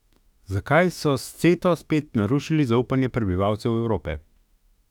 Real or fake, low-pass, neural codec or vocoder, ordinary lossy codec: fake; 19.8 kHz; autoencoder, 48 kHz, 32 numbers a frame, DAC-VAE, trained on Japanese speech; none